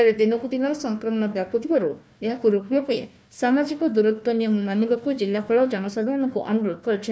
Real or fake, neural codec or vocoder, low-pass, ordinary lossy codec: fake; codec, 16 kHz, 1 kbps, FunCodec, trained on Chinese and English, 50 frames a second; none; none